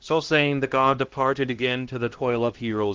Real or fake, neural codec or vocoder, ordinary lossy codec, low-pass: fake; codec, 16 kHz, 1 kbps, X-Codec, HuBERT features, trained on LibriSpeech; Opus, 16 kbps; 7.2 kHz